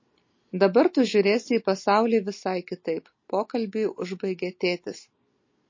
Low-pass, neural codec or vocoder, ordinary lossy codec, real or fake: 7.2 kHz; none; MP3, 32 kbps; real